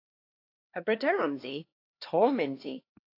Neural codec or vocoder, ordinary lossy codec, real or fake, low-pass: codec, 16 kHz, 2 kbps, X-Codec, HuBERT features, trained on LibriSpeech; AAC, 32 kbps; fake; 5.4 kHz